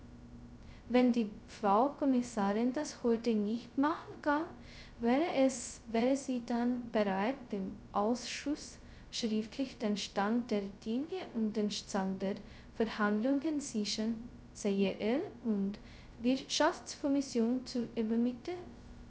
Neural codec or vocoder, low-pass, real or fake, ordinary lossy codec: codec, 16 kHz, 0.2 kbps, FocalCodec; none; fake; none